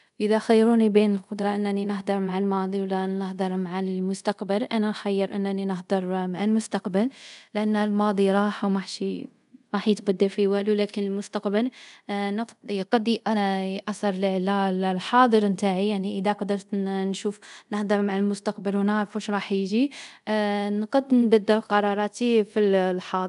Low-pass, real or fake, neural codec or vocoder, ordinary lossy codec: 10.8 kHz; fake; codec, 24 kHz, 0.5 kbps, DualCodec; none